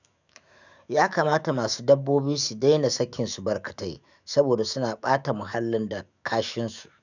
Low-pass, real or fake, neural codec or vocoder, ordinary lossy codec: 7.2 kHz; fake; autoencoder, 48 kHz, 128 numbers a frame, DAC-VAE, trained on Japanese speech; none